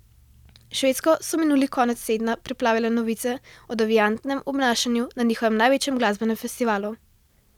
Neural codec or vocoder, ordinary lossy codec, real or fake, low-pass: none; none; real; 19.8 kHz